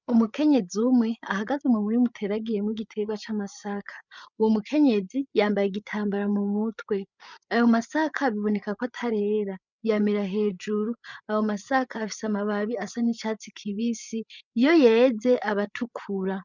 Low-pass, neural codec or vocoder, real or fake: 7.2 kHz; codec, 16 kHz, 8 kbps, FreqCodec, larger model; fake